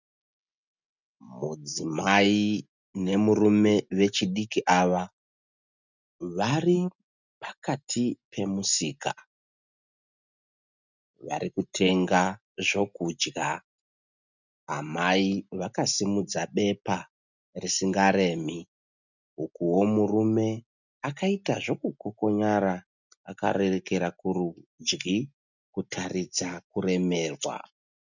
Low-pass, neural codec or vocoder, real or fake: 7.2 kHz; none; real